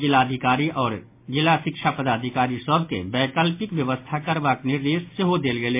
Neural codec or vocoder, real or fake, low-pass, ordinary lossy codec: none; real; 3.6 kHz; MP3, 24 kbps